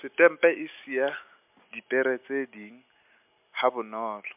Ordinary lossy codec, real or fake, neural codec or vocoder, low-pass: MP3, 32 kbps; real; none; 3.6 kHz